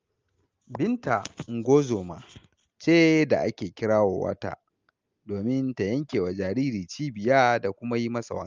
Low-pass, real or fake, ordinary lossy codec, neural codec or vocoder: 7.2 kHz; real; Opus, 32 kbps; none